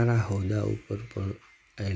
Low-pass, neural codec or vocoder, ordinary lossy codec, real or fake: none; none; none; real